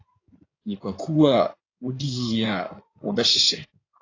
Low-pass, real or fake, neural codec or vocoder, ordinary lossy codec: 7.2 kHz; fake; codec, 16 kHz in and 24 kHz out, 2.2 kbps, FireRedTTS-2 codec; AAC, 32 kbps